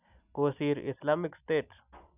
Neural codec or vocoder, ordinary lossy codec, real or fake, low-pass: none; none; real; 3.6 kHz